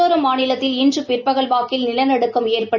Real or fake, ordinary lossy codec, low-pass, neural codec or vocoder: real; none; 7.2 kHz; none